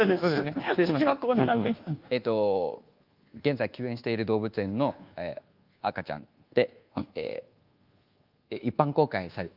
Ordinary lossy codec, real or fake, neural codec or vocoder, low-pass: Opus, 32 kbps; fake; codec, 24 kHz, 1.2 kbps, DualCodec; 5.4 kHz